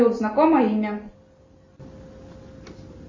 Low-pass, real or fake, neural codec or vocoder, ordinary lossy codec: 7.2 kHz; real; none; MP3, 32 kbps